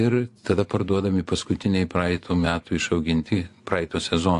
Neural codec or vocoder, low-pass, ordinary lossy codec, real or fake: vocoder, 24 kHz, 100 mel bands, Vocos; 10.8 kHz; AAC, 64 kbps; fake